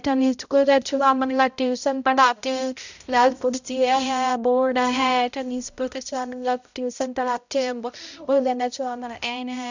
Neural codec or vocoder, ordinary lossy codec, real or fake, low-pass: codec, 16 kHz, 0.5 kbps, X-Codec, HuBERT features, trained on balanced general audio; none; fake; 7.2 kHz